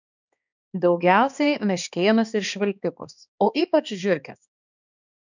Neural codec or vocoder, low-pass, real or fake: codec, 16 kHz, 2 kbps, X-Codec, HuBERT features, trained on balanced general audio; 7.2 kHz; fake